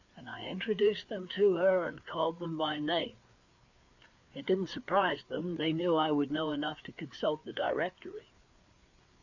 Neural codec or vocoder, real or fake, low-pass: codec, 16 kHz, 4 kbps, FreqCodec, larger model; fake; 7.2 kHz